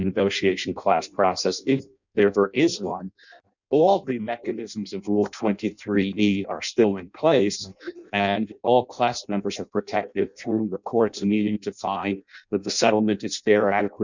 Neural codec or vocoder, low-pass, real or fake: codec, 16 kHz in and 24 kHz out, 0.6 kbps, FireRedTTS-2 codec; 7.2 kHz; fake